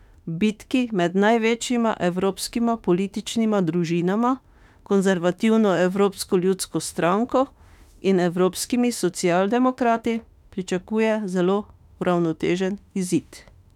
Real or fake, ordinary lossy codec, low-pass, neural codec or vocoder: fake; none; 19.8 kHz; autoencoder, 48 kHz, 32 numbers a frame, DAC-VAE, trained on Japanese speech